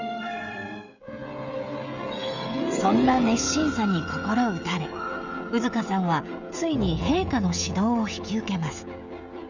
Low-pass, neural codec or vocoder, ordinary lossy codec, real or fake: 7.2 kHz; codec, 16 kHz, 16 kbps, FreqCodec, smaller model; none; fake